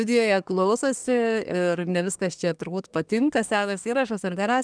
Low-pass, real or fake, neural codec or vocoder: 9.9 kHz; fake; codec, 24 kHz, 1 kbps, SNAC